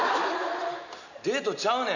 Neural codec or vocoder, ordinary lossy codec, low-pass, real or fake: none; none; 7.2 kHz; real